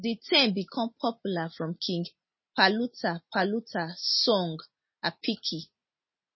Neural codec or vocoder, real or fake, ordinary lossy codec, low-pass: none; real; MP3, 24 kbps; 7.2 kHz